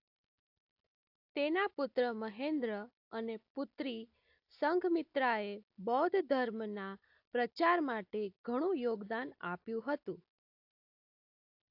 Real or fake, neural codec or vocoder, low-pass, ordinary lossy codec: real; none; 5.4 kHz; AAC, 48 kbps